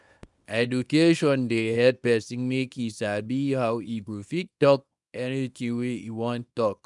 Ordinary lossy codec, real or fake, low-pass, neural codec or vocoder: none; fake; 10.8 kHz; codec, 24 kHz, 0.9 kbps, WavTokenizer, small release